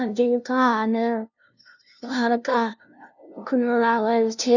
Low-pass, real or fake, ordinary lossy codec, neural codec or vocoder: 7.2 kHz; fake; none; codec, 16 kHz, 0.5 kbps, FunCodec, trained on LibriTTS, 25 frames a second